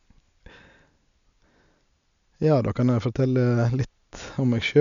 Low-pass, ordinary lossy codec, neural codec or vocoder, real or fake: 7.2 kHz; none; none; real